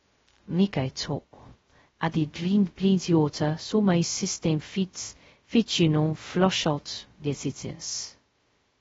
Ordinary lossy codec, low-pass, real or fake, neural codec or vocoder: AAC, 24 kbps; 7.2 kHz; fake; codec, 16 kHz, 0.2 kbps, FocalCodec